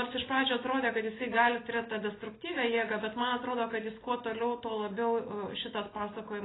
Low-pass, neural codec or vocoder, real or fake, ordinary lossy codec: 7.2 kHz; none; real; AAC, 16 kbps